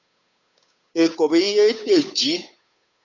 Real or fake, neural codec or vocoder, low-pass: fake; codec, 16 kHz, 8 kbps, FunCodec, trained on Chinese and English, 25 frames a second; 7.2 kHz